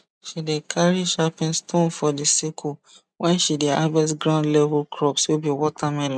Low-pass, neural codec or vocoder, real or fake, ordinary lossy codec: none; none; real; none